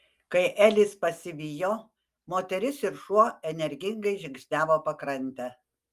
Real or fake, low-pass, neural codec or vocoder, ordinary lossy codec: real; 14.4 kHz; none; Opus, 32 kbps